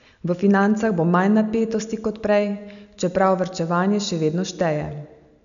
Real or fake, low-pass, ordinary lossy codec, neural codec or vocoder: real; 7.2 kHz; none; none